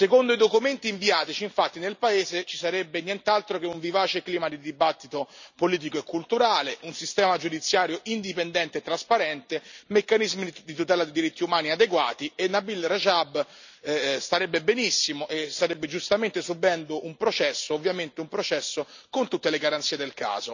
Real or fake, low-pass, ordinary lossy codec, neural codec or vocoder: real; 7.2 kHz; none; none